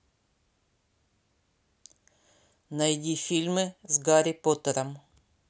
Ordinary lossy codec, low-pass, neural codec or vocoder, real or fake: none; none; none; real